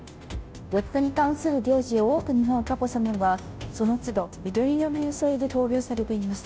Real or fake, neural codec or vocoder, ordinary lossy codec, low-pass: fake; codec, 16 kHz, 0.5 kbps, FunCodec, trained on Chinese and English, 25 frames a second; none; none